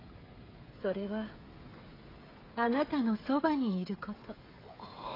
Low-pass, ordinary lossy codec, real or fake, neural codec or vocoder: 5.4 kHz; AAC, 24 kbps; fake; codec, 16 kHz, 16 kbps, FreqCodec, smaller model